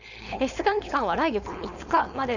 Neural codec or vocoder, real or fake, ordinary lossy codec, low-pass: codec, 16 kHz, 4.8 kbps, FACodec; fake; none; 7.2 kHz